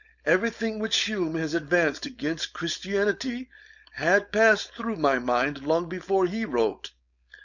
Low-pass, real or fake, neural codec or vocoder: 7.2 kHz; fake; codec, 16 kHz, 4.8 kbps, FACodec